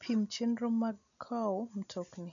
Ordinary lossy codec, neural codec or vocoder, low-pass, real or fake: none; none; 7.2 kHz; real